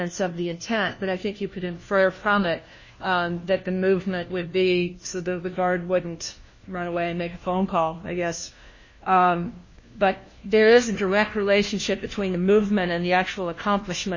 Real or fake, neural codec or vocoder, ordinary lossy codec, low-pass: fake; codec, 16 kHz, 1 kbps, FunCodec, trained on LibriTTS, 50 frames a second; MP3, 32 kbps; 7.2 kHz